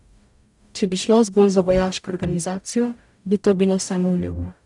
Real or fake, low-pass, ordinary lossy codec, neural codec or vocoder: fake; 10.8 kHz; none; codec, 44.1 kHz, 0.9 kbps, DAC